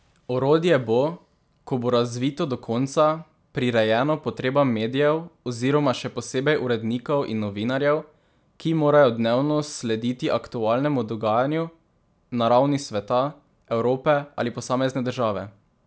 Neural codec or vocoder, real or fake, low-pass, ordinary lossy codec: none; real; none; none